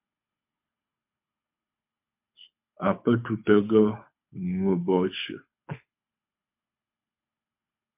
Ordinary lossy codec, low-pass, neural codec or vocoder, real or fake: MP3, 32 kbps; 3.6 kHz; codec, 24 kHz, 6 kbps, HILCodec; fake